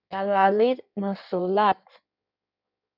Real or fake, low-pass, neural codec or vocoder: fake; 5.4 kHz; codec, 16 kHz in and 24 kHz out, 1.1 kbps, FireRedTTS-2 codec